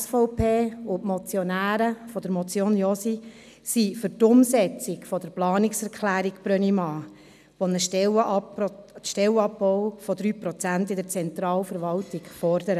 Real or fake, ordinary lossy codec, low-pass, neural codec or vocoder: real; none; 14.4 kHz; none